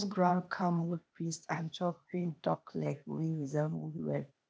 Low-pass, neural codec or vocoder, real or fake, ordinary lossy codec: none; codec, 16 kHz, 0.8 kbps, ZipCodec; fake; none